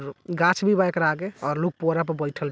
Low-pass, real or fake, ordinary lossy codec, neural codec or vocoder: none; real; none; none